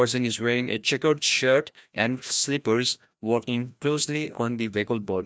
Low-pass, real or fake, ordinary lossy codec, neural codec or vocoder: none; fake; none; codec, 16 kHz, 1 kbps, FreqCodec, larger model